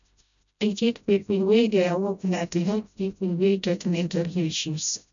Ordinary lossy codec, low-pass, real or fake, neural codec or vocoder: none; 7.2 kHz; fake; codec, 16 kHz, 0.5 kbps, FreqCodec, smaller model